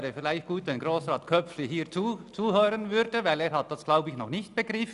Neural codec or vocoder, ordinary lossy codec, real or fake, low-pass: none; none; real; 10.8 kHz